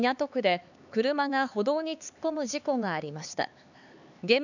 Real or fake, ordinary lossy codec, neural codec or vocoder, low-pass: fake; none; codec, 16 kHz, 4 kbps, X-Codec, HuBERT features, trained on LibriSpeech; 7.2 kHz